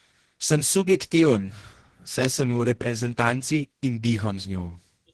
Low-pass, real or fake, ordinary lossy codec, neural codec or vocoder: 10.8 kHz; fake; Opus, 16 kbps; codec, 24 kHz, 0.9 kbps, WavTokenizer, medium music audio release